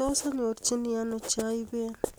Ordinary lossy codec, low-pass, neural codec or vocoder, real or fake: none; none; none; real